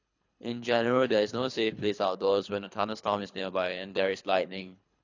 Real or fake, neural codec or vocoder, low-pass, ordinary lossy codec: fake; codec, 24 kHz, 3 kbps, HILCodec; 7.2 kHz; AAC, 48 kbps